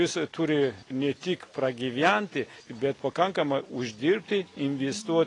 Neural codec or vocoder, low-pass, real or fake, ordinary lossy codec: none; 10.8 kHz; real; AAC, 32 kbps